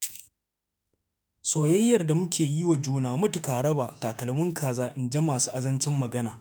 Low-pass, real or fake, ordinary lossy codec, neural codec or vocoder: none; fake; none; autoencoder, 48 kHz, 32 numbers a frame, DAC-VAE, trained on Japanese speech